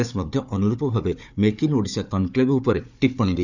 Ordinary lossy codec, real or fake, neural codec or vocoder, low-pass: none; fake; codec, 16 kHz, 4 kbps, FunCodec, trained on Chinese and English, 50 frames a second; 7.2 kHz